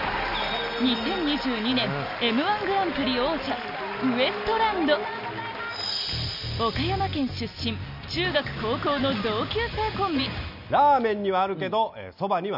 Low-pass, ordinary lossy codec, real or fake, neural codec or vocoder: 5.4 kHz; none; real; none